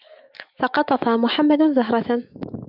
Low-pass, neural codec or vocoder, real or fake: 5.4 kHz; autoencoder, 48 kHz, 128 numbers a frame, DAC-VAE, trained on Japanese speech; fake